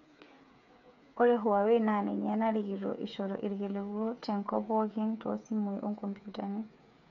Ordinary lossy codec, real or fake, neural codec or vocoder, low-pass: none; fake; codec, 16 kHz, 8 kbps, FreqCodec, smaller model; 7.2 kHz